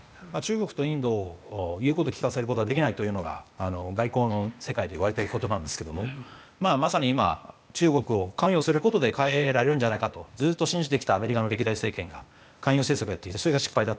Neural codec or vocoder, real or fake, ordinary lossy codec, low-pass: codec, 16 kHz, 0.8 kbps, ZipCodec; fake; none; none